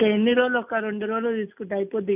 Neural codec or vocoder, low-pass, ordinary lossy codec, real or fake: none; 3.6 kHz; none; real